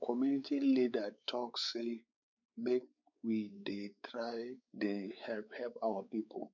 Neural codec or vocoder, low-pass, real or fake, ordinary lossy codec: codec, 16 kHz, 4 kbps, X-Codec, WavLM features, trained on Multilingual LibriSpeech; 7.2 kHz; fake; none